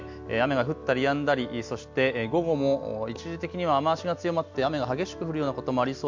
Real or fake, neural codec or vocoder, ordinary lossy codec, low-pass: real; none; MP3, 64 kbps; 7.2 kHz